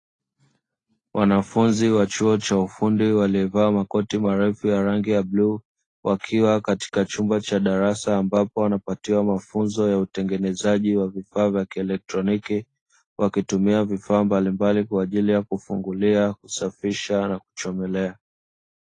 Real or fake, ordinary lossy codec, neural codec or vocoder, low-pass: real; AAC, 32 kbps; none; 10.8 kHz